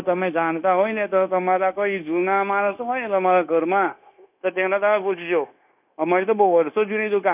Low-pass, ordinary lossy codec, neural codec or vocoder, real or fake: 3.6 kHz; none; codec, 16 kHz, 0.9 kbps, LongCat-Audio-Codec; fake